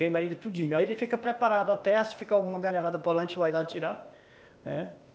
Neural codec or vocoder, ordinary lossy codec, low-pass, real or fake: codec, 16 kHz, 0.8 kbps, ZipCodec; none; none; fake